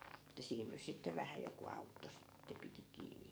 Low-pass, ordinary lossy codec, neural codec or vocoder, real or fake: none; none; codec, 44.1 kHz, 7.8 kbps, DAC; fake